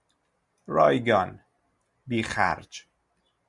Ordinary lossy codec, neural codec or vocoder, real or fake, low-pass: Opus, 64 kbps; none; real; 10.8 kHz